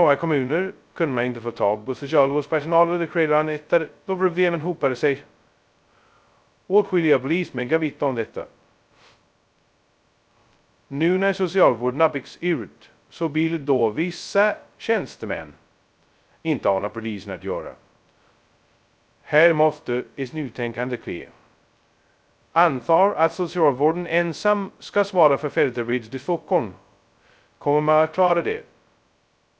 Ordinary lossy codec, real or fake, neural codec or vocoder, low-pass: none; fake; codec, 16 kHz, 0.2 kbps, FocalCodec; none